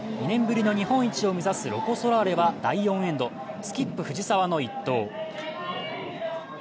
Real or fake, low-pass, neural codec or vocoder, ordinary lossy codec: real; none; none; none